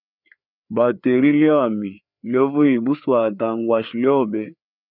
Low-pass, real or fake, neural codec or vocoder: 5.4 kHz; fake; codec, 16 kHz, 4 kbps, FreqCodec, larger model